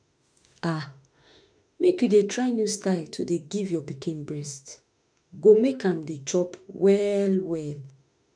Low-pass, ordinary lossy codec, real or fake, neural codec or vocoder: 9.9 kHz; none; fake; autoencoder, 48 kHz, 32 numbers a frame, DAC-VAE, trained on Japanese speech